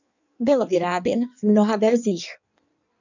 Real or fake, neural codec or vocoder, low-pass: fake; codec, 16 kHz in and 24 kHz out, 1.1 kbps, FireRedTTS-2 codec; 7.2 kHz